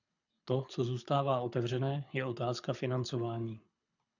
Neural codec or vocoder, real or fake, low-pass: codec, 24 kHz, 6 kbps, HILCodec; fake; 7.2 kHz